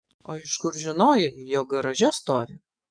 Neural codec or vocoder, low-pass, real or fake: codec, 44.1 kHz, 7.8 kbps, DAC; 9.9 kHz; fake